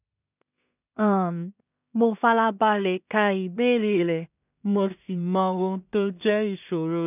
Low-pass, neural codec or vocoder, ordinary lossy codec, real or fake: 3.6 kHz; codec, 16 kHz in and 24 kHz out, 0.4 kbps, LongCat-Audio-Codec, two codebook decoder; none; fake